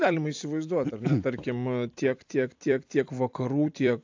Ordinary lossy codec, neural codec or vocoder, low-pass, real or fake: AAC, 48 kbps; vocoder, 44.1 kHz, 128 mel bands every 256 samples, BigVGAN v2; 7.2 kHz; fake